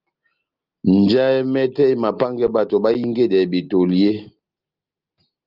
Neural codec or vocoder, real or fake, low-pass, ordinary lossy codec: none; real; 5.4 kHz; Opus, 32 kbps